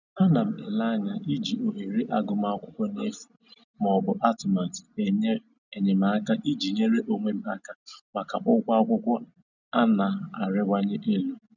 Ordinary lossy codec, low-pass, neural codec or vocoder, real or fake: none; 7.2 kHz; none; real